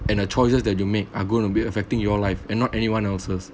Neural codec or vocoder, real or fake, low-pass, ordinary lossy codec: none; real; none; none